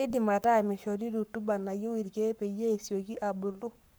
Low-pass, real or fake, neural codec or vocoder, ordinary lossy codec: none; fake; codec, 44.1 kHz, 7.8 kbps, Pupu-Codec; none